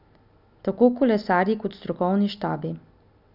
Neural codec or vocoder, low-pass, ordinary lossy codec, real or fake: none; 5.4 kHz; none; real